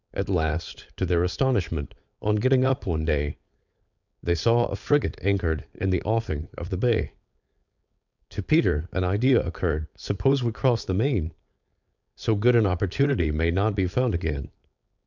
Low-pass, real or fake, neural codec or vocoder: 7.2 kHz; fake; codec, 16 kHz, 4.8 kbps, FACodec